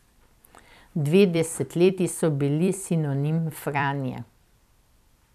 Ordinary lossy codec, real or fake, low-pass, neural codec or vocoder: none; fake; 14.4 kHz; vocoder, 44.1 kHz, 128 mel bands every 256 samples, BigVGAN v2